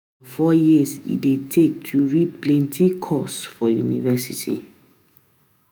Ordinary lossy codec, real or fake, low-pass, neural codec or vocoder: none; fake; none; autoencoder, 48 kHz, 128 numbers a frame, DAC-VAE, trained on Japanese speech